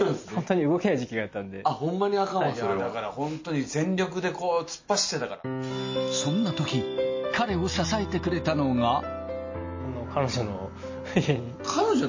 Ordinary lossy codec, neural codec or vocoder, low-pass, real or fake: MP3, 32 kbps; none; 7.2 kHz; real